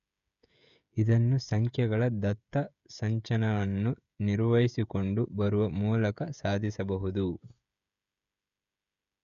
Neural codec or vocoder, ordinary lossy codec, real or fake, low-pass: codec, 16 kHz, 16 kbps, FreqCodec, smaller model; none; fake; 7.2 kHz